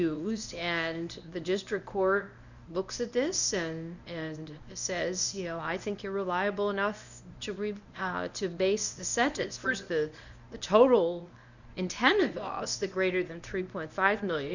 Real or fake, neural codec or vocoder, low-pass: fake; codec, 24 kHz, 0.9 kbps, WavTokenizer, medium speech release version 1; 7.2 kHz